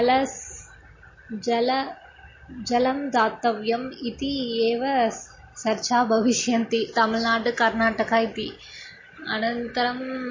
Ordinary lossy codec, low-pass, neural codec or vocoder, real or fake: MP3, 32 kbps; 7.2 kHz; none; real